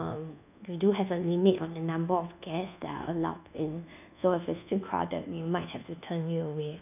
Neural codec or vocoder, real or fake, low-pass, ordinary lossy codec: codec, 24 kHz, 1.2 kbps, DualCodec; fake; 3.6 kHz; none